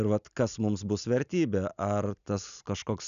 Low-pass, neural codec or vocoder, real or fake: 7.2 kHz; none; real